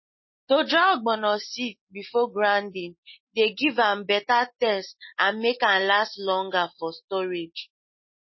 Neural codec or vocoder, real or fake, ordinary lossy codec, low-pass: none; real; MP3, 24 kbps; 7.2 kHz